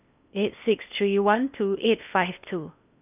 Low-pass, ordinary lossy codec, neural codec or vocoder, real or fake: 3.6 kHz; none; codec, 16 kHz in and 24 kHz out, 0.8 kbps, FocalCodec, streaming, 65536 codes; fake